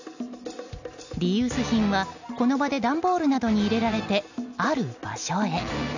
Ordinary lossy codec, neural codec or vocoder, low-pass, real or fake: none; none; 7.2 kHz; real